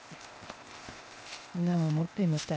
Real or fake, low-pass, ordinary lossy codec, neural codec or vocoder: fake; none; none; codec, 16 kHz, 0.8 kbps, ZipCodec